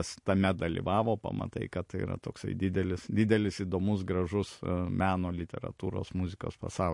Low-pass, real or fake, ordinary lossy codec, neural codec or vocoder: 10.8 kHz; real; MP3, 48 kbps; none